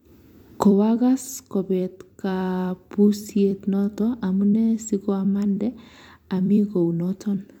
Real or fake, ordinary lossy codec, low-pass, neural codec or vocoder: fake; MP3, 96 kbps; 19.8 kHz; vocoder, 44.1 kHz, 128 mel bands every 256 samples, BigVGAN v2